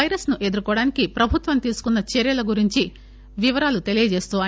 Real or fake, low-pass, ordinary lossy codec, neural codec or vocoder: real; none; none; none